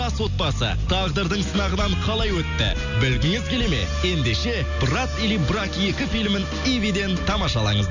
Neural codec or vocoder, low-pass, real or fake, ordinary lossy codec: none; 7.2 kHz; real; none